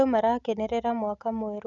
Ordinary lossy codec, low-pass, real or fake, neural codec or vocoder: none; 7.2 kHz; real; none